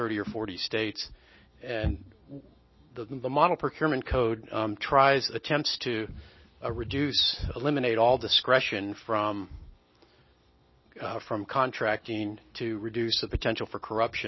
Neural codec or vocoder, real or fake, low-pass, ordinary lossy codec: none; real; 7.2 kHz; MP3, 24 kbps